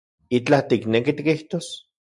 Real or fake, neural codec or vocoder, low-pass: real; none; 10.8 kHz